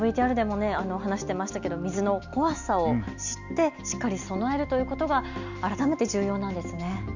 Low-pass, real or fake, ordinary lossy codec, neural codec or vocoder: 7.2 kHz; real; none; none